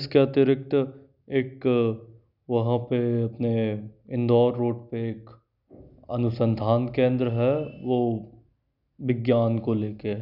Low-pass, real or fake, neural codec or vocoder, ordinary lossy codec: 5.4 kHz; real; none; none